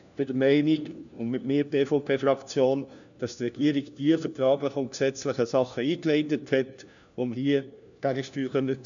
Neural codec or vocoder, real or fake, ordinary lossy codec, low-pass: codec, 16 kHz, 1 kbps, FunCodec, trained on LibriTTS, 50 frames a second; fake; none; 7.2 kHz